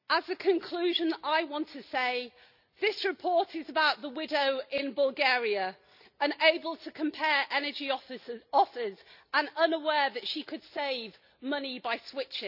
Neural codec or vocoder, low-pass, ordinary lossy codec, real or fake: none; 5.4 kHz; none; real